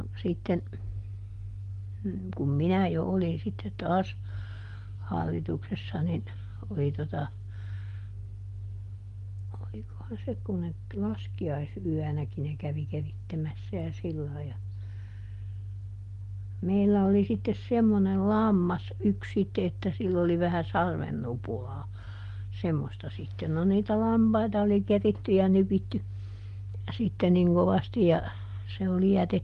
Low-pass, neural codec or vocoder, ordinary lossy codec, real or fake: 10.8 kHz; none; Opus, 24 kbps; real